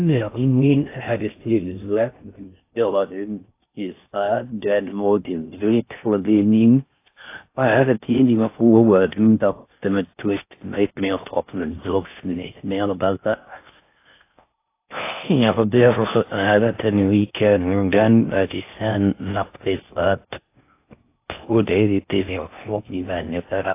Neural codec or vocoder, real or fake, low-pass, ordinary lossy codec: codec, 16 kHz in and 24 kHz out, 0.6 kbps, FocalCodec, streaming, 4096 codes; fake; 3.6 kHz; AAC, 24 kbps